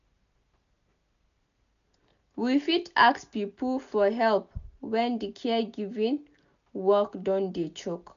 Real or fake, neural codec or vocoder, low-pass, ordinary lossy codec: real; none; 7.2 kHz; none